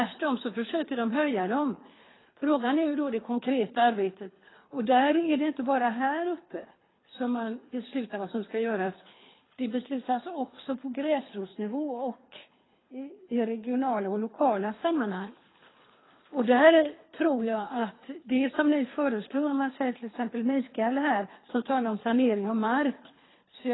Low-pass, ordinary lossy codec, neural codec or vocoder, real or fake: 7.2 kHz; AAC, 16 kbps; codec, 24 kHz, 3 kbps, HILCodec; fake